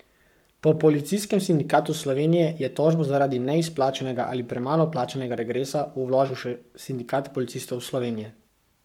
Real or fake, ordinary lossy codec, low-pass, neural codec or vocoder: fake; MP3, 96 kbps; 19.8 kHz; codec, 44.1 kHz, 7.8 kbps, Pupu-Codec